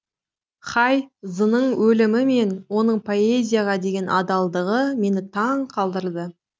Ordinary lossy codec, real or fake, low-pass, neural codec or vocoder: none; real; none; none